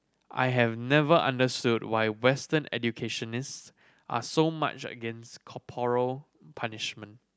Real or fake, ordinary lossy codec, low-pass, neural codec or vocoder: real; none; none; none